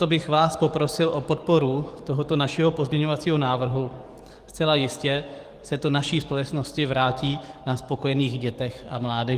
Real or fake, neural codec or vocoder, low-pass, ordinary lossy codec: fake; codec, 44.1 kHz, 7.8 kbps, DAC; 14.4 kHz; Opus, 24 kbps